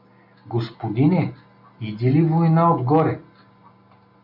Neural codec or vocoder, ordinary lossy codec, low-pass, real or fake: none; MP3, 48 kbps; 5.4 kHz; real